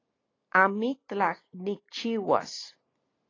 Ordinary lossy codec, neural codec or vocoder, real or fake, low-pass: AAC, 32 kbps; none; real; 7.2 kHz